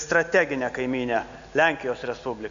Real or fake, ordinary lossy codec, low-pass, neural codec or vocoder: real; AAC, 64 kbps; 7.2 kHz; none